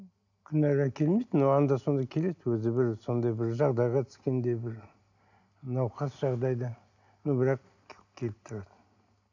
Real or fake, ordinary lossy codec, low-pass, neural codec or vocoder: real; none; 7.2 kHz; none